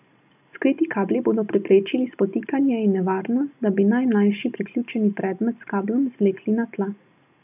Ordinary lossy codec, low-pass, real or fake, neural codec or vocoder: none; 3.6 kHz; real; none